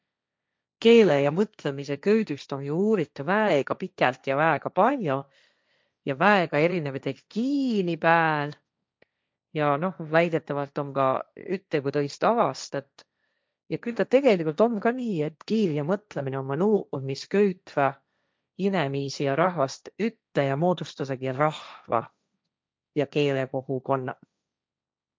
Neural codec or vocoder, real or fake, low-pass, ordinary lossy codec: codec, 16 kHz, 1.1 kbps, Voila-Tokenizer; fake; none; none